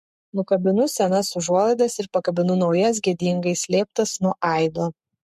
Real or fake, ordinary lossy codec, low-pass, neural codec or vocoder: fake; MP3, 64 kbps; 14.4 kHz; codec, 44.1 kHz, 7.8 kbps, Pupu-Codec